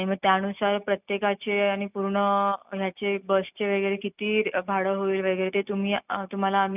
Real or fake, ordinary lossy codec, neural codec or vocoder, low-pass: real; none; none; 3.6 kHz